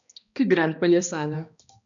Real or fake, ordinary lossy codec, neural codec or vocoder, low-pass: fake; MP3, 96 kbps; codec, 16 kHz, 1 kbps, X-Codec, HuBERT features, trained on balanced general audio; 7.2 kHz